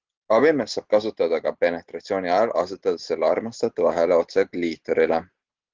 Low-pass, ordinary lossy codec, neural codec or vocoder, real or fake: 7.2 kHz; Opus, 16 kbps; none; real